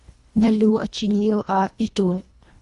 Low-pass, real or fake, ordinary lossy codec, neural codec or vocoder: 10.8 kHz; fake; Opus, 64 kbps; codec, 24 kHz, 1.5 kbps, HILCodec